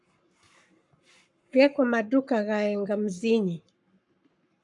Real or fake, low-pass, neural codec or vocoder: fake; 10.8 kHz; codec, 44.1 kHz, 7.8 kbps, Pupu-Codec